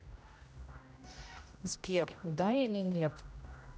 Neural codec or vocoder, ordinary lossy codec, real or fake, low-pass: codec, 16 kHz, 0.5 kbps, X-Codec, HuBERT features, trained on general audio; none; fake; none